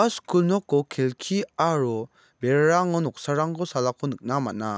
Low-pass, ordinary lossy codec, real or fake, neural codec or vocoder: none; none; real; none